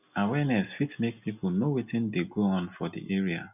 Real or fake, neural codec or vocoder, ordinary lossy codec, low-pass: real; none; none; 3.6 kHz